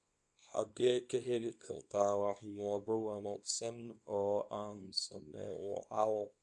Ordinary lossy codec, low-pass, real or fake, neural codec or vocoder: none; 10.8 kHz; fake; codec, 24 kHz, 0.9 kbps, WavTokenizer, small release